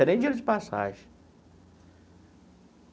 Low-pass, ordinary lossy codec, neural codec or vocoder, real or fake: none; none; none; real